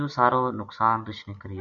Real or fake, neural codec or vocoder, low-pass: real; none; 7.2 kHz